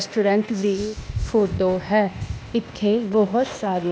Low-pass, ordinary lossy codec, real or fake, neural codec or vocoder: none; none; fake; codec, 16 kHz, 0.8 kbps, ZipCodec